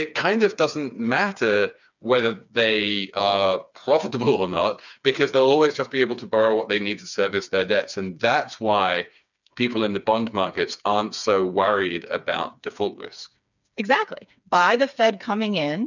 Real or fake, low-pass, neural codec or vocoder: fake; 7.2 kHz; codec, 16 kHz, 4 kbps, FreqCodec, smaller model